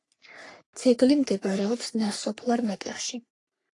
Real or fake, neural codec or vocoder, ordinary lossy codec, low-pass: fake; codec, 44.1 kHz, 3.4 kbps, Pupu-Codec; AAC, 48 kbps; 10.8 kHz